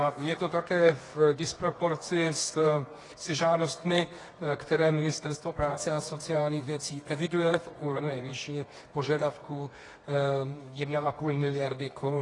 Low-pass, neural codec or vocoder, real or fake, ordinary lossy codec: 10.8 kHz; codec, 24 kHz, 0.9 kbps, WavTokenizer, medium music audio release; fake; AAC, 32 kbps